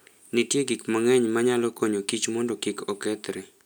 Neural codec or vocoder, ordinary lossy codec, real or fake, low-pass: none; none; real; none